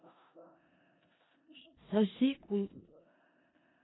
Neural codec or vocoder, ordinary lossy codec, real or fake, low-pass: codec, 16 kHz in and 24 kHz out, 0.4 kbps, LongCat-Audio-Codec, four codebook decoder; AAC, 16 kbps; fake; 7.2 kHz